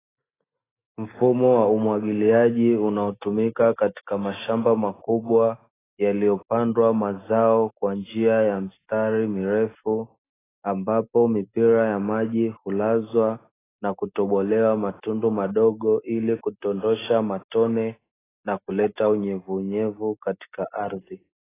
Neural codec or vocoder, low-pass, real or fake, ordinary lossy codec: none; 3.6 kHz; real; AAC, 16 kbps